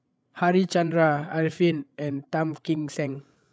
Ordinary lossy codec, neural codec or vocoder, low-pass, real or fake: none; codec, 16 kHz, 8 kbps, FreqCodec, larger model; none; fake